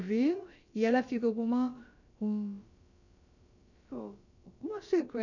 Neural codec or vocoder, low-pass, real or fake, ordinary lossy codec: codec, 16 kHz, about 1 kbps, DyCAST, with the encoder's durations; 7.2 kHz; fake; none